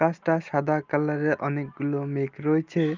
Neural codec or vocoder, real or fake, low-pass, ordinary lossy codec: none; real; 7.2 kHz; Opus, 32 kbps